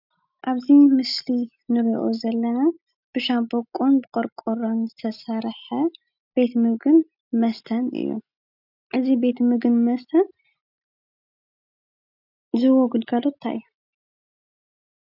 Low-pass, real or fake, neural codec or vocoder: 5.4 kHz; real; none